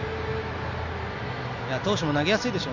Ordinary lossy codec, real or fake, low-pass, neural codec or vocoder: none; real; 7.2 kHz; none